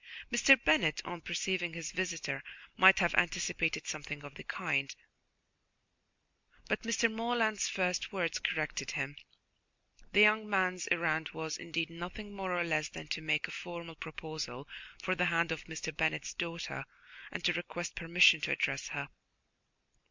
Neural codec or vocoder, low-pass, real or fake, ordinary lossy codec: none; 7.2 kHz; real; MP3, 64 kbps